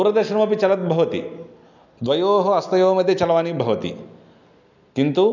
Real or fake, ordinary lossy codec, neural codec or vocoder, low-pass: real; none; none; 7.2 kHz